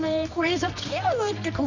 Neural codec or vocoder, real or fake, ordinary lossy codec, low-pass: codec, 24 kHz, 0.9 kbps, WavTokenizer, medium music audio release; fake; none; 7.2 kHz